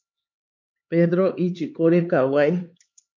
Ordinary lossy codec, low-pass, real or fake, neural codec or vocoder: MP3, 64 kbps; 7.2 kHz; fake; codec, 16 kHz, 2 kbps, X-Codec, HuBERT features, trained on LibriSpeech